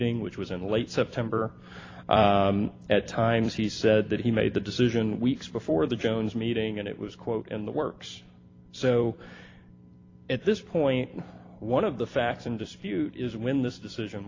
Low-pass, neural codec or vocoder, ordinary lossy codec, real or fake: 7.2 kHz; vocoder, 44.1 kHz, 128 mel bands every 256 samples, BigVGAN v2; AAC, 32 kbps; fake